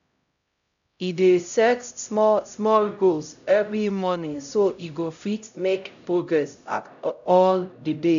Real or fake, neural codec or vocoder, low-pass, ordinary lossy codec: fake; codec, 16 kHz, 0.5 kbps, X-Codec, HuBERT features, trained on LibriSpeech; 7.2 kHz; none